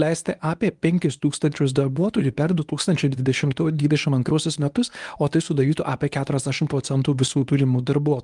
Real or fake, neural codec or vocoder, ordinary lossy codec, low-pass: fake; codec, 24 kHz, 0.9 kbps, WavTokenizer, medium speech release version 1; Opus, 32 kbps; 10.8 kHz